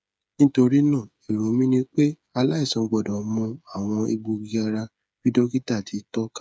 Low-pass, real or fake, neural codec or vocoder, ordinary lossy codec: none; fake; codec, 16 kHz, 8 kbps, FreqCodec, smaller model; none